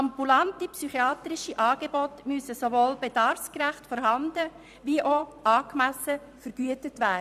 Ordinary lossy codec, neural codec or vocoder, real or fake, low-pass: none; vocoder, 44.1 kHz, 128 mel bands every 256 samples, BigVGAN v2; fake; 14.4 kHz